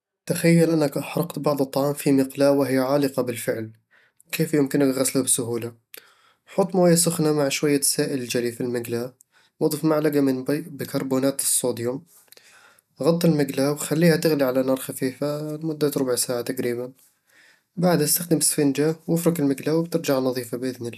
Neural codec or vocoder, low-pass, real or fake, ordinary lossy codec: none; 14.4 kHz; real; none